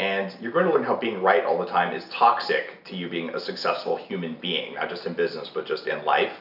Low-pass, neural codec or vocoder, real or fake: 5.4 kHz; none; real